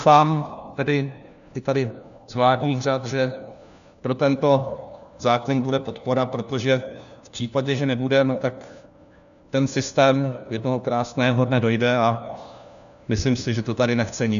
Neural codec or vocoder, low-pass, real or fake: codec, 16 kHz, 1 kbps, FunCodec, trained on LibriTTS, 50 frames a second; 7.2 kHz; fake